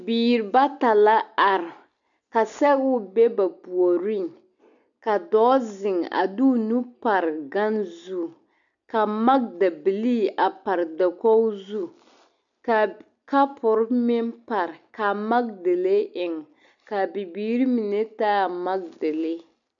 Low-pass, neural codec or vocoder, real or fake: 7.2 kHz; none; real